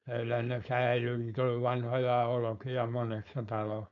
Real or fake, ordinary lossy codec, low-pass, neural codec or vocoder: fake; none; 7.2 kHz; codec, 16 kHz, 4.8 kbps, FACodec